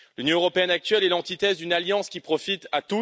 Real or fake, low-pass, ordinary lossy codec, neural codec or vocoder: real; none; none; none